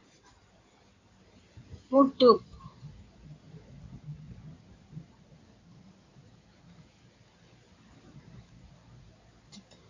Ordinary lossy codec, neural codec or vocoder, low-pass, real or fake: AAC, 48 kbps; codec, 16 kHz in and 24 kHz out, 2.2 kbps, FireRedTTS-2 codec; 7.2 kHz; fake